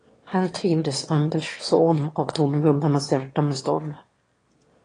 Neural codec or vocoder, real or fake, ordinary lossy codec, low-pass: autoencoder, 22.05 kHz, a latent of 192 numbers a frame, VITS, trained on one speaker; fake; AAC, 32 kbps; 9.9 kHz